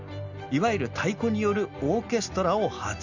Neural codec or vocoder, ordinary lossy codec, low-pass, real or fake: none; none; 7.2 kHz; real